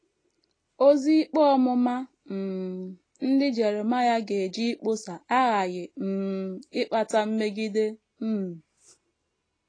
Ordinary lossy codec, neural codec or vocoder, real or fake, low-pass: AAC, 32 kbps; none; real; 9.9 kHz